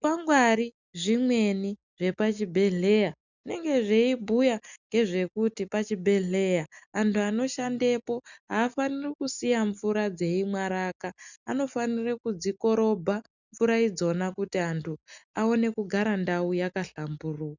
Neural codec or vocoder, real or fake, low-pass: none; real; 7.2 kHz